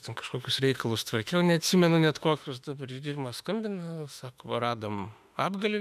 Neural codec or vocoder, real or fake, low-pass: autoencoder, 48 kHz, 32 numbers a frame, DAC-VAE, trained on Japanese speech; fake; 14.4 kHz